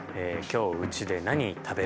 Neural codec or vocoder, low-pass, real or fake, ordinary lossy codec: none; none; real; none